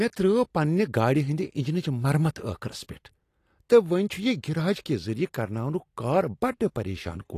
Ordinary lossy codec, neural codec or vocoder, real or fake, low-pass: AAC, 48 kbps; none; real; 14.4 kHz